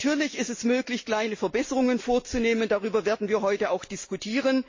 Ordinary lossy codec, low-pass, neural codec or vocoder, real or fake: MP3, 32 kbps; 7.2 kHz; none; real